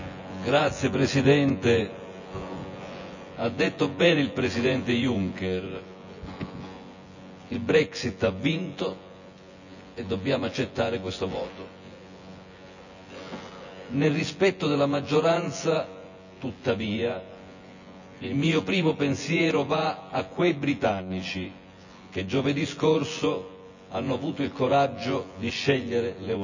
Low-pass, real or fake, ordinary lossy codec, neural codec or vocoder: 7.2 kHz; fake; none; vocoder, 24 kHz, 100 mel bands, Vocos